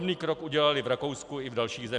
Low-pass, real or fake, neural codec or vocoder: 10.8 kHz; real; none